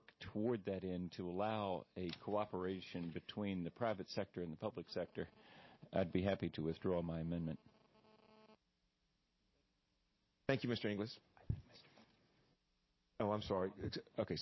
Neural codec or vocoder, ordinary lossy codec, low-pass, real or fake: none; MP3, 24 kbps; 7.2 kHz; real